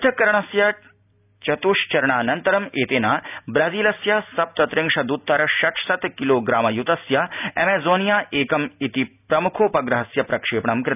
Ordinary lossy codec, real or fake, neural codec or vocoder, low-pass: none; real; none; 3.6 kHz